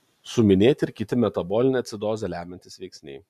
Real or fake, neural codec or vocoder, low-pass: real; none; 14.4 kHz